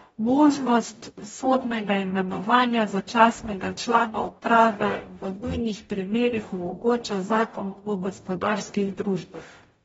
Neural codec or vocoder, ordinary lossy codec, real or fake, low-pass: codec, 44.1 kHz, 0.9 kbps, DAC; AAC, 24 kbps; fake; 19.8 kHz